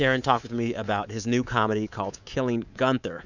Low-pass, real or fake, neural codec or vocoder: 7.2 kHz; fake; codec, 24 kHz, 3.1 kbps, DualCodec